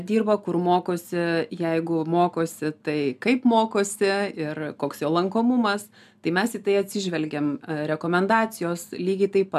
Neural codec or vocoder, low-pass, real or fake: none; 14.4 kHz; real